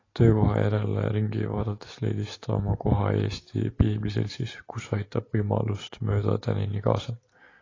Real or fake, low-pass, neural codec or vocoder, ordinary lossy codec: real; 7.2 kHz; none; AAC, 32 kbps